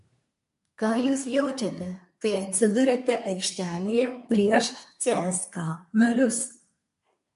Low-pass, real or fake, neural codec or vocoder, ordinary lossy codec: 10.8 kHz; fake; codec, 24 kHz, 1 kbps, SNAC; MP3, 64 kbps